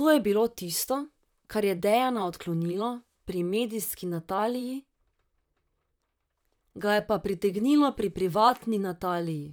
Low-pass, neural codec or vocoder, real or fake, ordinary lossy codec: none; vocoder, 44.1 kHz, 128 mel bands, Pupu-Vocoder; fake; none